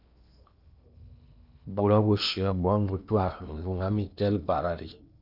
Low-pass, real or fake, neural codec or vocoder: 5.4 kHz; fake; codec, 16 kHz in and 24 kHz out, 0.8 kbps, FocalCodec, streaming, 65536 codes